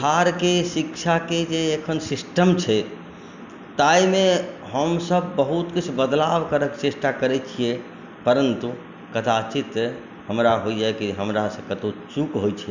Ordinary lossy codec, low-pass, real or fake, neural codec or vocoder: none; 7.2 kHz; real; none